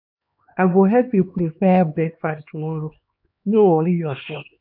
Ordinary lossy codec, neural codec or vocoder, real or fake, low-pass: AAC, 48 kbps; codec, 16 kHz, 2 kbps, X-Codec, HuBERT features, trained on LibriSpeech; fake; 5.4 kHz